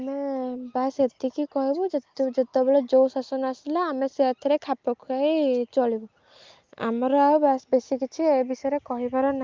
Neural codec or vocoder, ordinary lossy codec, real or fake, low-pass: none; Opus, 24 kbps; real; 7.2 kHz